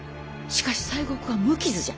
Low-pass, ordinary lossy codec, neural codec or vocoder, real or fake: none; none; none; real